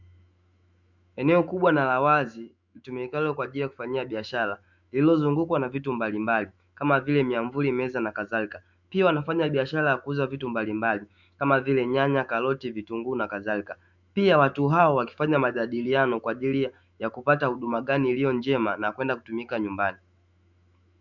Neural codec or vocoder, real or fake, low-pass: autoencoder, 48 kHz, 128 numbers a frame, DAC-VAE, trained on Japanese speech; fake; 7.2 kHz